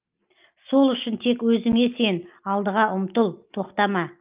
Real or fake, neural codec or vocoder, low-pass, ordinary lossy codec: real; none; 3.6 kHz; Opus, 24 kbps